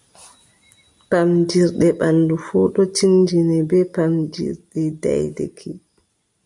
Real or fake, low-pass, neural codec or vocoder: real; 10.8 kHz; none